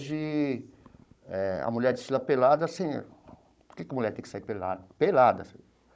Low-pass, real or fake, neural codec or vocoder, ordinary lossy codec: none; fake; codec, 16 kHz, 16 kbps, FunCodec, trained on Chinese and English, 50 frames a second; none